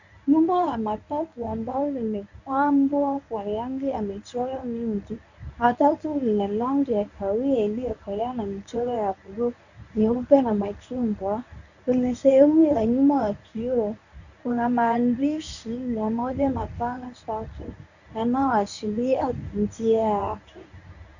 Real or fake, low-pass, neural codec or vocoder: fake; 7.2 kHz; codec, 24 kHz, 0.9 kbps, WavTokenizer, medium speech release version 1